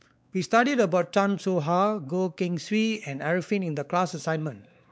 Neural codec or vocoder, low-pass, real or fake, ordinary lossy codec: codec, 16 kHz, 4 kbps, X-Codec, WavLM features, trained on Multilingual LibriSpeech; none; fake; none